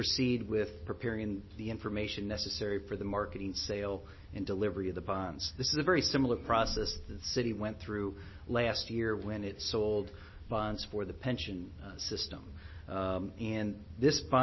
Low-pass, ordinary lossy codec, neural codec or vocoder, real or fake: 7.2 kHz; MP3, 24 kbps; none; real